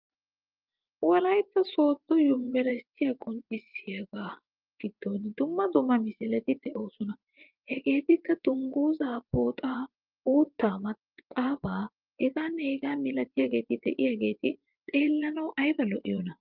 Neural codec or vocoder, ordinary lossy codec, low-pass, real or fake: vocoder, 24 kHz, 100 mel bands, Vocos; Opus, 32 kbps; 5.4 kHz; fake